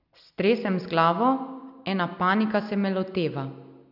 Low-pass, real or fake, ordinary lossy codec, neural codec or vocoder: 5.4 kHz; real; none; none